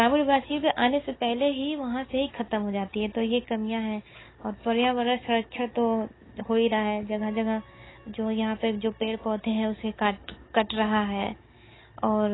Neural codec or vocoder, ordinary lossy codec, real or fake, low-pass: none; AAC, 16 kbps; real; 7.2 kHz